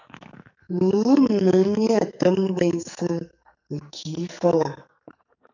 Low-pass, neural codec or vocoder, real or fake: 7.2 kHz; codec, 44.1 kHz, 2.6 kbps, SNAC; fake